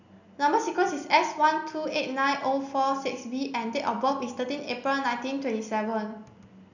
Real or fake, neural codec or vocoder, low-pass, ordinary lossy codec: real; none; 7.2 kHz; none